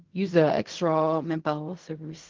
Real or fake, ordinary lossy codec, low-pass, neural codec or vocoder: fake; Opus, 32 kbps; 7.2 kHz; codec, 16 kHz in and 24 kHz out, 0.4 kbps, LongCat-Audio-Codec, fine tuned four codebook decoder